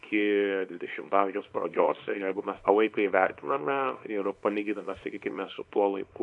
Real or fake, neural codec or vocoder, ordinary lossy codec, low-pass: fake; codec, 24 kHz, 0.9 kbps, WavTokenizer, small release; AAC, 64 kbps; 10.8 kHz